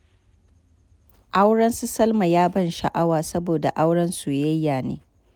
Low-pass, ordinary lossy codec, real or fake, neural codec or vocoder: none; none; real; none